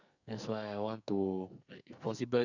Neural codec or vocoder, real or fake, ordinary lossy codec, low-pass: codec, 44.1 kHz, 2.6 kbps, SNAC; fake; none; 7.2 kHz